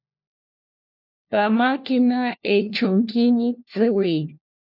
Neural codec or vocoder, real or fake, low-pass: codec, 16 kHz, 1 kbps, FunCodec, trained on LibriTTS, 50 frames a second; fake; 5.4 kHz